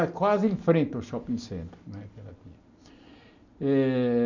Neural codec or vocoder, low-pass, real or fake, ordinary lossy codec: none; 7.2 kHz; real; none